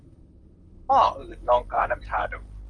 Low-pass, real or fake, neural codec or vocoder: 9.9 kHz; fake; vocoder, 44.1 kHz, 128 mel bands, Pupu-Vocoder